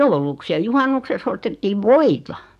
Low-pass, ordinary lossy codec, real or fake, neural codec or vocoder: 14.4 kHz; none; fake; codec, 44.1 kHz, 7.8 kbps, DAC